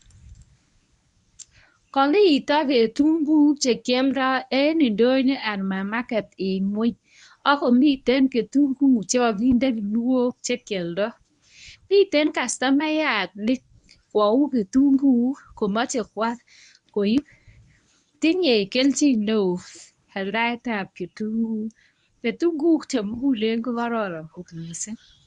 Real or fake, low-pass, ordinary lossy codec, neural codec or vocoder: fake; 10.8 kHz; none; codec, 24 kHz, 0.9 kbps, WavTokenizer, medium speech release version 1